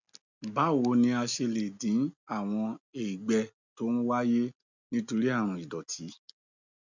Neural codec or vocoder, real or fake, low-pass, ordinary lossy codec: none; real; 7.2 kHz; none